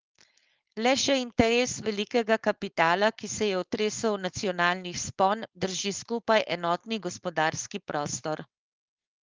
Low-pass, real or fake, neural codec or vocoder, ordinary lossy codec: 7.2 kHz; fake; codec, 16 kHz, 4.8 kbps, FACodec; Opus, 32 kbps